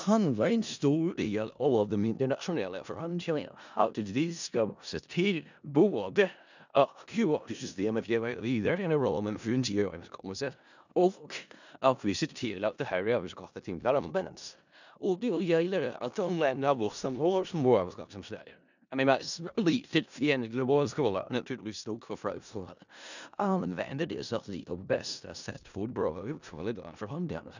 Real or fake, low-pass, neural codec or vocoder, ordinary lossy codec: fake; 7.2 kHz; codec, 16 kHz in and 24 kHz out, 0.4 kbps, LongCat-Audio-Codec, four codebook decoder; none